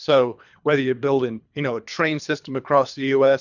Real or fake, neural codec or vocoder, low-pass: fake; codec, 24 kHz, 3 kbps, HILCodec; 7.2 kHz